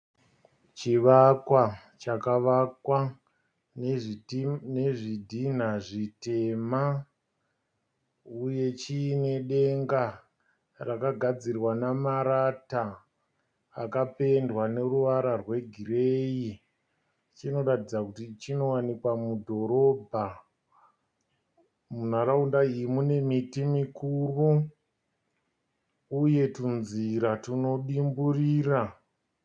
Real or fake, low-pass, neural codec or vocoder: real; 9.9 kHz; none